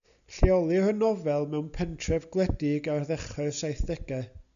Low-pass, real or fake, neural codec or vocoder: 7.2 kHz; real; none